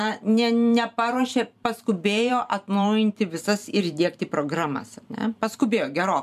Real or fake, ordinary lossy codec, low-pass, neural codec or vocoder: fake; MP3, 96 kbps; 14.4 kHz; vocoder, 44.1 kHz, 128 mel bands every 512 samples, BigVGAN v2